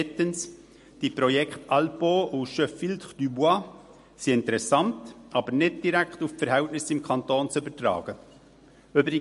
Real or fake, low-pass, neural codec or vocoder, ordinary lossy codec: real; 14.4 kHz; none; MP3, 48 kbps